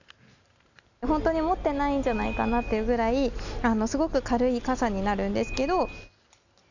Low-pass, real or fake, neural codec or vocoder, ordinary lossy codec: 7.2 kHz; real; none; none